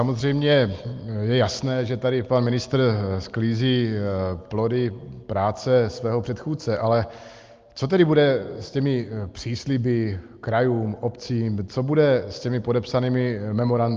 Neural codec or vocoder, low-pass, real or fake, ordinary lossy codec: none; 7.2 kHz; real; Opus, 32 kbps